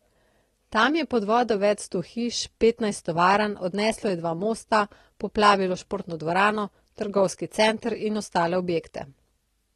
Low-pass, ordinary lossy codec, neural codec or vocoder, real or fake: 19.8 kHz; AAC, 32 kbps; none; real